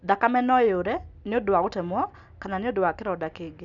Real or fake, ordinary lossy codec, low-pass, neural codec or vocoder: real; none; 7.2 kHz; none